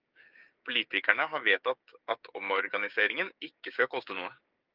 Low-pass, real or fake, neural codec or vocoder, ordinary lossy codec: 5.4 kHz; fake; codec, 16 kHz, 6 kbps, DAC; Opus, 24 kbps